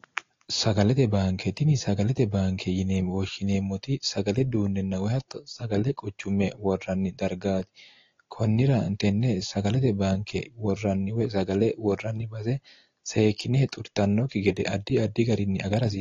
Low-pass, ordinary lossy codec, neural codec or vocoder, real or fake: 7.2 kHz; AAC, 32 kbps; none; real